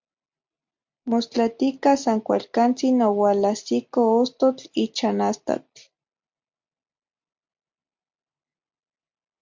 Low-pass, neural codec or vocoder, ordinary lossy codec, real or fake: 7.2 kHz; none; MP3, 64 kbps; real